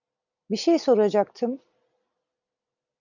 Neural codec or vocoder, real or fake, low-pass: none; real; 7.2 kHz